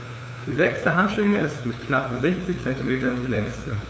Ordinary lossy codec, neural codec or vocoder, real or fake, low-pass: none; codec, 16 kHz, 2 kbps, FunCodec, trained on LibriTTS, 25 frames a second; fake; none